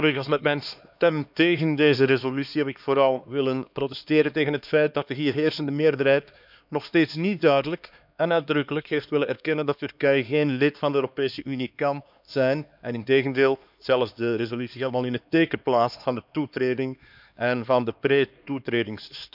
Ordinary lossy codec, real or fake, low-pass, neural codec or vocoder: none; fake; 5.4 kHz; codec, 16 kHz, 2 kbps, X-Codec, HuBERT features, trained on LibriSpeech